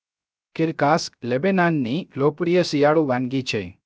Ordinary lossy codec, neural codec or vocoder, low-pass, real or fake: none; codec, 16 kHz, 0.3 kbps, FocalCodec; none; fake